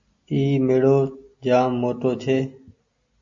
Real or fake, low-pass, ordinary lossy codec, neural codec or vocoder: real; 7.2 kHz; AAC, 64 kbps; none